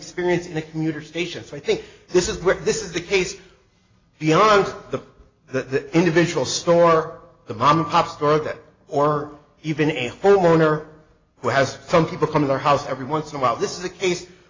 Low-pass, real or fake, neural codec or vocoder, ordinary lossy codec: 7.2 kHz; real; none; AAC, 32 kbps